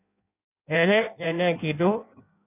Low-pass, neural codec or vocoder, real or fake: 3.6 kHz; codec, 16 kHz in and 24 kHz out, 0.6 kbps, FireRedTTS-2 codec; fake